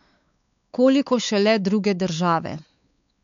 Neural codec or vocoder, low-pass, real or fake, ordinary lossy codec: codec, 16 kHz, 4 kbps, X-Codec, WavLM features, trained on Multilingual LibriSpeech; 7.2 kHz; fake; none